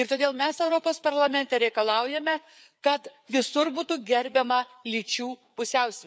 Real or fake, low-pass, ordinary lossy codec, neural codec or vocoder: fake; none; none; codec, 16 kHz, 4 kbps, FreqCodec, larger model